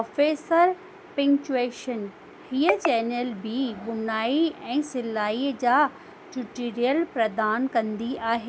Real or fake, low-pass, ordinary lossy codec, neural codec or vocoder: real; none; none; none